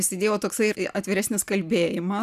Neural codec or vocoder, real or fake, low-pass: none; real; 14.4 kHz